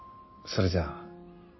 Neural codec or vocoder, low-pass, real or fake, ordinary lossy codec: none; 7.2 kHz; real; MP3, 24 kbps